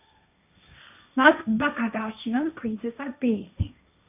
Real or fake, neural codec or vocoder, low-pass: fake; codec, 16 kHz, 1.1 kbps, Voila-Tokenizer; 3.6 kHz